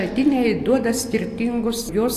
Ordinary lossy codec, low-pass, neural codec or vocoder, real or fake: AAC, 64 kbps; 14.4 kHz; none; real